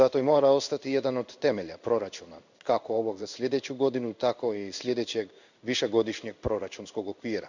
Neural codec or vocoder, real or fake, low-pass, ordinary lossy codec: codec, 16 kHz in and 24 kHz out, 1 kbps, XY-Tokenizer; fake; 7.2 kHz; none